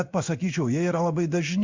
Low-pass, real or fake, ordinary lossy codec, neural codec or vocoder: 7.2 kHz; fake; Opus, 64 kbps; codec, 16 kHz in and 24 kHz out, 1 kbps, XY-Tokenizer